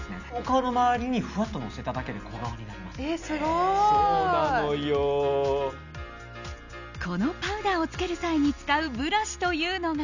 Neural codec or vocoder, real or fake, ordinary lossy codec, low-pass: none; real; none; 7.2 kHz